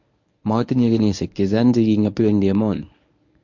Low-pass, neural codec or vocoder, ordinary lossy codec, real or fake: 7.2 kHz; codec, 24 kHz, 0.9 kbps, WavTokenizer, medium speech release version 1; MP3, 48 kbps; fake